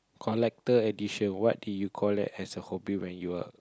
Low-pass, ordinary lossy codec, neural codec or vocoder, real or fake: none; none; none; real